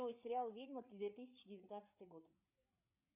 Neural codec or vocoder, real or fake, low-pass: codec, 16 kHz, 8 kbps, FreqCodec, larger model; fake; 3.6 kHz